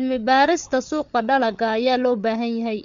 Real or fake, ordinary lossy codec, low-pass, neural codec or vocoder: fake; MP3, 64 kbps; 7.2 kHz; codec, 16 kHz, 8 kbps, FreqCodec, larger model